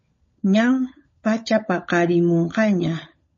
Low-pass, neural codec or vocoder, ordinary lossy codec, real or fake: 7.2 kHz; codec, 16 kHz, 16 kbps, FunCodec, trained on LibriTTS, 50 frames a second; MP3, 32 kbps; fake